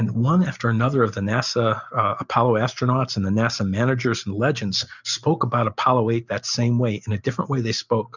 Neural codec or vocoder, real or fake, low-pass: none; real; 7.2 kHz